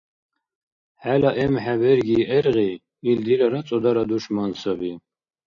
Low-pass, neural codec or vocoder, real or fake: 7.2 kHz; none; real